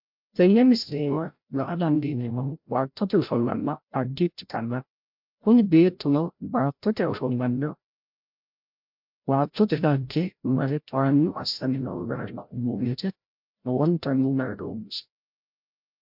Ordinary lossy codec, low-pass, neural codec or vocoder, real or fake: MP3, 48 kbps; 5.4 kHz; codec, 16 kHz, 0.5 kbps, FreqCodec, larger model; fake